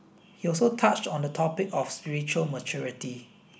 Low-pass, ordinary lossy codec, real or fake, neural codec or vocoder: none; none; real; none